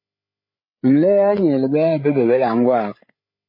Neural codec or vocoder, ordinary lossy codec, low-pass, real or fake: codec, 16 kHz, 8 kbps, FreqCodec, larger model; MP3, 24 kbps; 5.4 kHz; fake